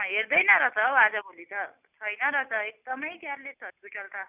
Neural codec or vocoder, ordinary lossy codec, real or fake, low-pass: none; none; real; 3.6 kHz